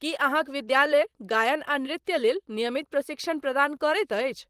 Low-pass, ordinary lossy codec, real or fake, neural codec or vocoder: 14.4 kHz; Opus, 24 kbps; fake; vocoder, 44.1 kHz, 128 mel bands, Pupu-Vocoder